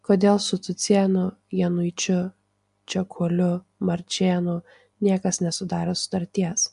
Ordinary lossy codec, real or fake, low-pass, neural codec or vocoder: MP3, 64 kbps; real; 10.8 kHz; none